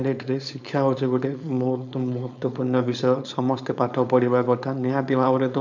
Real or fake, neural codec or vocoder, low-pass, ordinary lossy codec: fake; codec, 16 kHz, 4.8 kbps, FACodec; 7.2 kHz; none